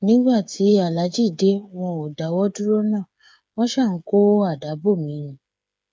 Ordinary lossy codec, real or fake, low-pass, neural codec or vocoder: none; fake; none; codec, 16 kHz, 8 kbps, FreqCodec, smaller model